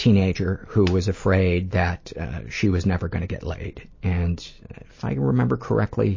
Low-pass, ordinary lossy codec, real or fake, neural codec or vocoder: 7.2 kHz; MP3, 32 kbps; real; none